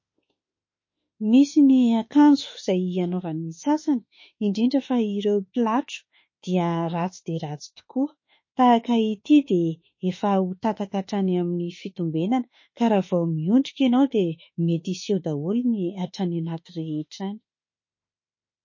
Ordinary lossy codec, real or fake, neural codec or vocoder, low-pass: MP3, 32 kbps; fake; autoencoder, 48 kHz, 32 numbers a frame, DAC-VAE, trained on Japanese speech; 7.2 kHz